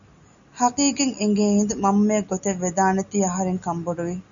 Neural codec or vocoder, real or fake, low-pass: none; real; 7.2 kHz